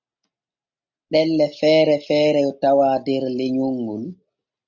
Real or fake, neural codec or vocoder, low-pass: real; none; 7.2 kHz